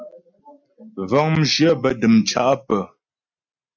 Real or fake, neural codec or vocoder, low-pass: real; none; 7.2 kHz